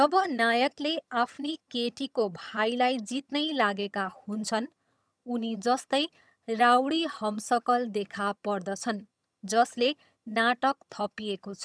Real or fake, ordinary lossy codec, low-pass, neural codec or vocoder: fake; none; none; vocoder, 22.05 kHz, 80 mel bands, HiFi-GAN